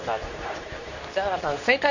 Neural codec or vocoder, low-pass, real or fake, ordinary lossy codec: codec, 24 kHz, 0.9 kbps, WavTokenizer, medium speech release version 2; 7.2 kHz; fake; none